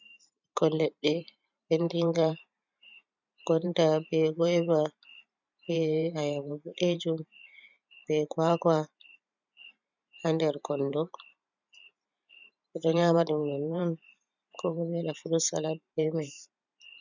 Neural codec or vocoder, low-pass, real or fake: vocoder, 44.1 kHz, 128 mel bands every 512 samples, BigVGAN v2; 7.2 kHz; fake